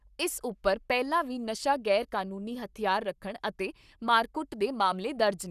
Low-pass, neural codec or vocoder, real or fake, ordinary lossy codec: 14.4 kHz; codec, 44.1 kHz, 7.8 kbps, Pupu-Codec; fake; none